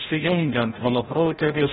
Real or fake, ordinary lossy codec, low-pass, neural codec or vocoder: fake; AAC, 16 kbps; 7.2 kHz; codec, 16 kHz, 0.5 kbps, FreqCodec, larger model